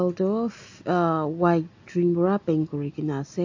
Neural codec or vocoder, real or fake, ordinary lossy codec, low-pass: none; real; AAC, 48 kbps; 7.2 kHz